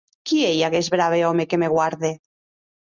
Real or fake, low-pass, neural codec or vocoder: real; 7.2 kHz; none